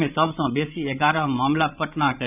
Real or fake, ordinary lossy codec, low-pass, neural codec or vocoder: fake; none; 3.6 kHz; codec, 16 kHz, 16 kbps, FreqCodec, larger model